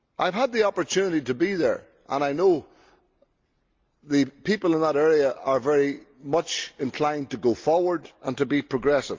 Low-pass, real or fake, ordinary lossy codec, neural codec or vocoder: 7.2 kHz; real; Opus, 32 kbps; none